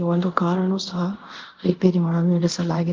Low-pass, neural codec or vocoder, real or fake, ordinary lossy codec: 7.2 kHz; codec, 24 kHz, 0.5 kbps, DualCodec; fake; Opus, 16 kbps